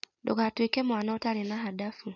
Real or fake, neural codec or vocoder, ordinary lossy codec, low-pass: real; none; none; 7.2 kHz